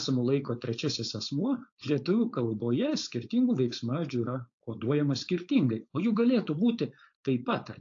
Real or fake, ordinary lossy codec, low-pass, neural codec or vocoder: fake; AAC, 48 kbps; 7.2 kHz; codec, 16 kHz, 4.8 kbps, FACodec